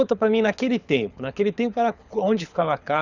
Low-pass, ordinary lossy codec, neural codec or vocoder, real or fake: 7.2 kHz; none; codec, 24 kHz, 6 kbps, HILCodec; fake